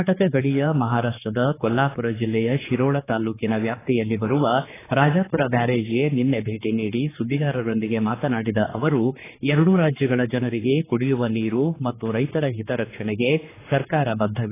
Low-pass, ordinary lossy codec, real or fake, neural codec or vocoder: 3.6 kHz; AAC, 16 kbps; fake; codec, 16 kHz, 4 kbps, X-Codec, HuBERT features, trained on general audio